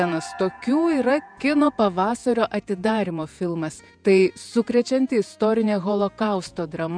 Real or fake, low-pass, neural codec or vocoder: fake; 9.9 kHz; vocoder, 48 kHz, 128 mel bands, Vocos